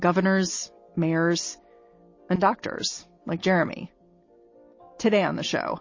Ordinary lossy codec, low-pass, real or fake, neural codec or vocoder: MP3, 32 kbps; 7.2 kHz; real; none